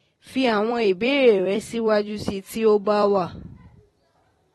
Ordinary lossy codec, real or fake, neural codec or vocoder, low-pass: AAC, 32 kbps; fake; vocoder, 44.1 kHz, 128 mel bands every 256 samples, BigVGAN v2; 19.8 kHz